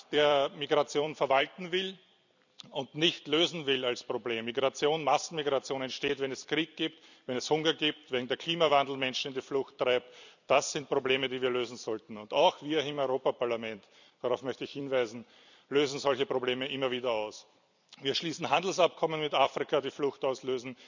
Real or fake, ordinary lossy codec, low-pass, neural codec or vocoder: fake; none; 7.2 kHz; vocoder, 44.1 kHz, 128 mel bands every 256 samples, BigVGAN v2